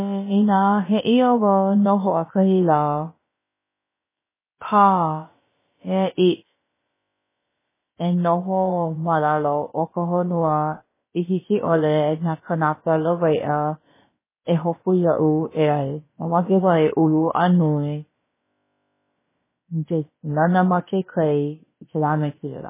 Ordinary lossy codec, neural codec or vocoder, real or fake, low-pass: MP3, 16 kbps; codec, 16 kHz, about 1 kbps, DyCAST, with the encoder's durations; fake; 3.6 kHz